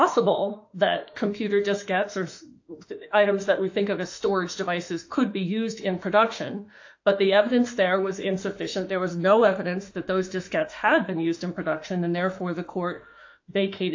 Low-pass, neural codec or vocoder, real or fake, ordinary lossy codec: 7.2 kHz; autoencoder, 48 kHz, 32 numbers a frame, DAC-VAE, trained on Japanese speech; fake; AAC, 48 kbps